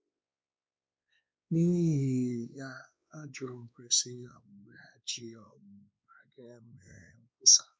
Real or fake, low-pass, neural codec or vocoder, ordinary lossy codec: fake; none; codec, 16 kHz, 2 kbps, X-Codec, WavLM features, trained on Multilingual LibriSpeech; none